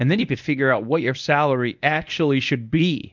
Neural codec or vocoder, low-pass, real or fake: codec, 24 kHz, 0.9 kbps, WavTokenizer, medium speech release version 1; 7.2 kHz; fake